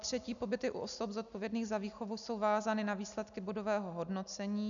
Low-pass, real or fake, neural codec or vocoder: 7.2 kHz; real; none